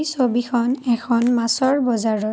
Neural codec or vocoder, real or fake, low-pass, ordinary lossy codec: none; real; none; none